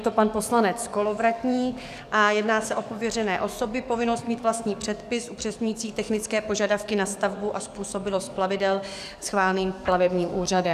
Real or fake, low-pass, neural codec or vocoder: fake; 14.4 kHz; codec, 44.1 kHz, 7.8 kbps, DAC